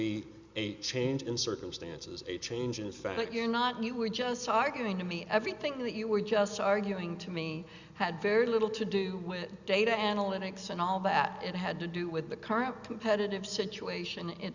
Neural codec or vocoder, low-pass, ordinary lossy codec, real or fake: none; 7.2 kHz; Opus, 64 kbps; real